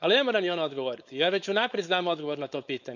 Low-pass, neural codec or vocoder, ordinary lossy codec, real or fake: 7.2 kHz; codec, 16 kHz, 4.8 kbps, FACodec; none; fake